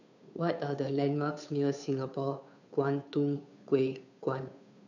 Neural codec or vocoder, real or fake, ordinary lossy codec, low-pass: codec, 16 kHz, 2 kbps, FunCodec, trained on Chinese and English, 25 frames a second; fake; none; 7.2 kHz